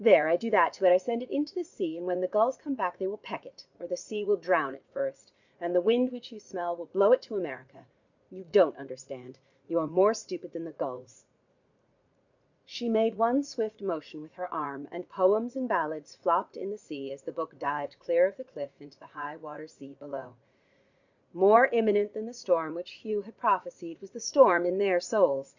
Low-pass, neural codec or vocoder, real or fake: 7.2 kHz; vocoder, 44.1 kHz, 80 mel bands, Vocos; fake